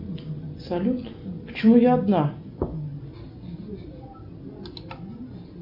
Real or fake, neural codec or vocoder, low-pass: real; none; 5.4 kHz